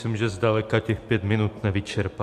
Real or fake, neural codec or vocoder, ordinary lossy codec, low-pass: real; none; MP3, 64 kbps; 14.4 kHz